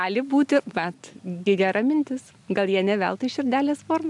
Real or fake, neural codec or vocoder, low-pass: real; none; 10.8 kHz